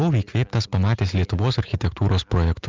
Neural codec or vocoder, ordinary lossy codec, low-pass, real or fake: none; Opus, 32 kbps; 7.2 kHz; real